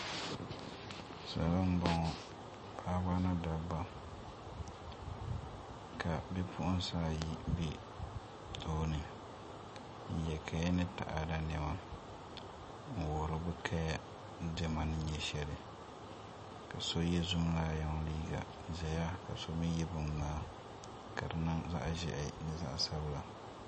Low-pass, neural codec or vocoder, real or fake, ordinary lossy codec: 9.9 kHz; none; real; MP3, 32 kbps